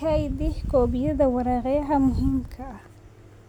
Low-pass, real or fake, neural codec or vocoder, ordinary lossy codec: 19.8 kHz; real; none; none